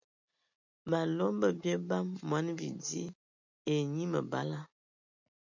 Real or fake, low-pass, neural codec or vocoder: real; 7.2 kHz; none